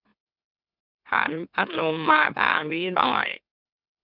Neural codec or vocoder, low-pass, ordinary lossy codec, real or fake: autoencoder, 44.1 kHz, a latent of 192 numbers a frame, MeloTTS; 5.4 kHz; none; fake